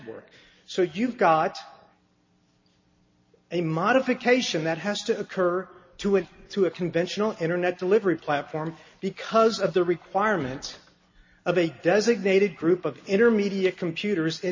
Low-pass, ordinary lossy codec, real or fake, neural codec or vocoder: 7.2 kHz; MP3, 32 kbps; real; none